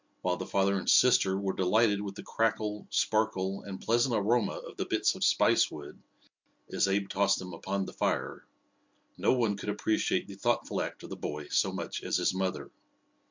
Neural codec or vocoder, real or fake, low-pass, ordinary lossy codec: none; real; 7.2 kHz; MP3, 64 kbps